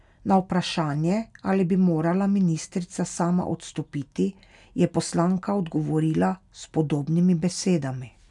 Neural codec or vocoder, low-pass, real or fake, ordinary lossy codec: none; 10.8 kHz; real; none